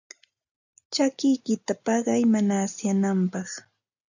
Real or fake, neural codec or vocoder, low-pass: real; none; 7.2 kHz